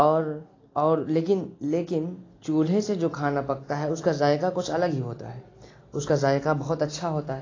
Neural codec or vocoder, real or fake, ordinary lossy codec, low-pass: none; real; AAC, 32 kbps; 7.2 kHz